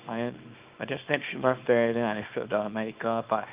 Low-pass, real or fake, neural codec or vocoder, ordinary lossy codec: 3.6 kHz; fake; codec, 24 kHz, 0.9 kbps, WavTokenizer, small release; Opus, 32 kbps